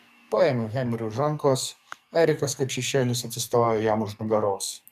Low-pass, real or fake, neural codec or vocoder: 14.4 kHz; fake; codec, 44.1 kHz, 2.6 kbps, SNAC